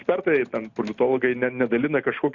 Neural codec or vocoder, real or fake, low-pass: none; real; 7.2 kHz